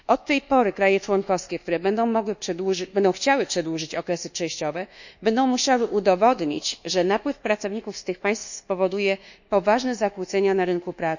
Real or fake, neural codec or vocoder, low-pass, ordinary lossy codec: fake; codec, 24 kHz, 1.2 kbps, DualCodec; 7.2 kHz; none